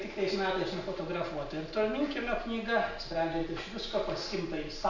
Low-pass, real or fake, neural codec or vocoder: 7.2 kHz; real; none